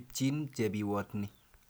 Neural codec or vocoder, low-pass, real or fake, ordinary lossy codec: none; none; real; none